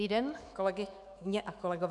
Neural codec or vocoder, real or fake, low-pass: autoencoder, 48 kHz, 128 numbers a frame, DAC-VAE, trained on Japanese speech; fake; 10.8 kHz